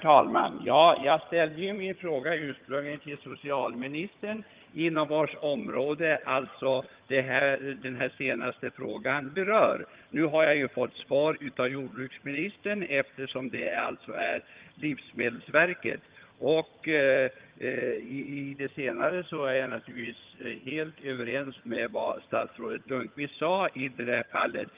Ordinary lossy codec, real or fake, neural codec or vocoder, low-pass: Opus, 24 kbps; fake; vocoder, 22.05 kHz, 80 mel bands, HiFi-GAN; 3.6 kHz